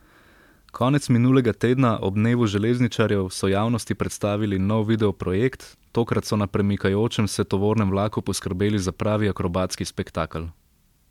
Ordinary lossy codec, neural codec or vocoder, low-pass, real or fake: MP3, 96 kbps; none; 19.8 kHz; real